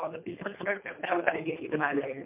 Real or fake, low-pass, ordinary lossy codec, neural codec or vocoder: fake; 3.6 kHz; MP3, 32 kbps; codec, 24 kHz, 3 kbps, HILCodec